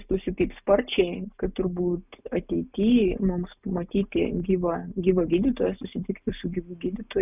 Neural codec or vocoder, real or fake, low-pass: none; real; 3.6 kHz